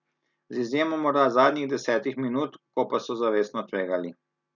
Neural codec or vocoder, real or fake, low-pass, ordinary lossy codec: none; real; 7.2 kHz; none